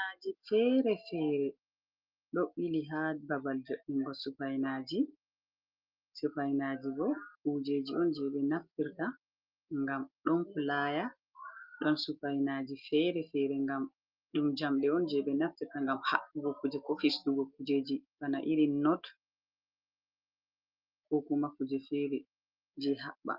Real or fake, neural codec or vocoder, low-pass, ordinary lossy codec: real; none; 5.4 kHz; Opus, 24 kbps